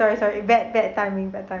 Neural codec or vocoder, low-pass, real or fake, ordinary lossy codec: none; 7.2 kHz; real; none